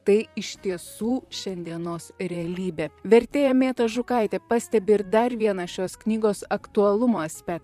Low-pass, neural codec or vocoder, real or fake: 14.4 kHz; vocoder, 44.1 kHz, 128 mel bands, Pupu-Vocoder; fake